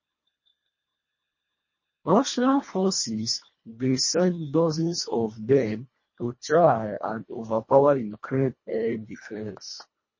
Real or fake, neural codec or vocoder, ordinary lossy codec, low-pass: fake; codec, 24 kHz, 1.5 kbps, HILCodec; MP3, 32 kbps; 7.2 kHz